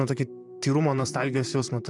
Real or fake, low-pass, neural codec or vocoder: fake; 10.8 kHz; vocoder, 44.1 kHz, 128 mel bands, Pupu-Vocoder